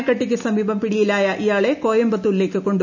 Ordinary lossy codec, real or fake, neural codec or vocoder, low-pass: none; real; none; 7.2 kHz